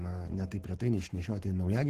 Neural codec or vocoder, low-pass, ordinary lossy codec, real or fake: codec, 44.1 kHz, 7.8 kbps, Pupu-Codec; 14.4 kHz; Opus, 16 kbps; fake